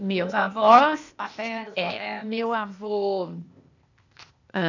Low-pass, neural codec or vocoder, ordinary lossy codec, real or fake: 7.2 kHz; codec, 16 kHz, 0.8 kbps, ZipCodec; none; fake